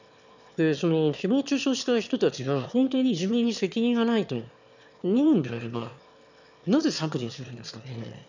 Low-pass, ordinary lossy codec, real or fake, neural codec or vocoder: 7.2 kHz; none; fake; autoencoder, 22.05 kHz, a latent of 192 numbers a frame, VITS, trained on one speaker